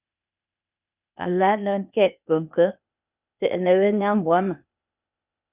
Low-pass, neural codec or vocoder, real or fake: 3.6 kHz; codec, 16 kHz, 0.8 kbps, ZipCodec; fake